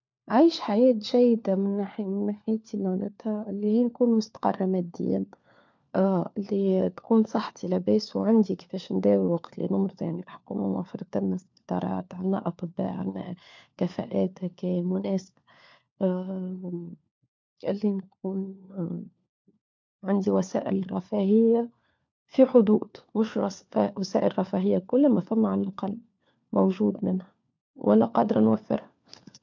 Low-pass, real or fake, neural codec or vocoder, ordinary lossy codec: 7.2 kHz; fake; codec, 16 kHz, 4 kbps, FunCodec, trained on LibriTTS, 50 frames a second; MP3, 64 kbps